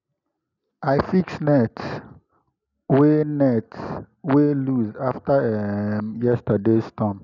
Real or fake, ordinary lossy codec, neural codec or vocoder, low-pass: real; none; none; 7.2 kHz